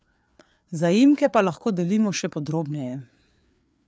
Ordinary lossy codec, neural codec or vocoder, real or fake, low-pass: none; codec, 16 kHz, 4 kbps, FreqCodec, larger model; fake; none